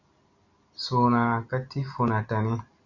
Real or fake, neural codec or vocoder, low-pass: real; none; 7.2 kHz